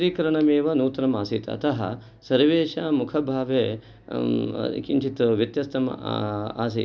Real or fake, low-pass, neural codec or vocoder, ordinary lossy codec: real; none; none; none